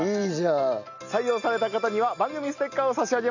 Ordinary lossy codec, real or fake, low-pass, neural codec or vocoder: none; real; 7.2 kHz; none